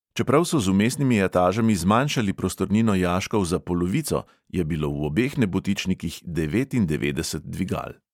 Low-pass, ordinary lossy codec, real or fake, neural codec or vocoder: 19.8 kHz; MP3, 96 kbps; real; none